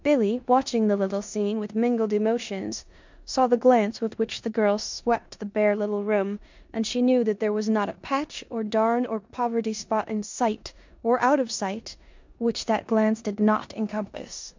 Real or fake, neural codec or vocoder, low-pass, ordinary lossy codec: fake; codec, 16 kHz in and 24 kHz out, 0.9 kbps, LongCat-Audio-Codec, four codebook decoder; 7.2 kHz; MP3, 64 kbps